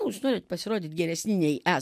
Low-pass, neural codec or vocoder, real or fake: 14.4 kHz; none; real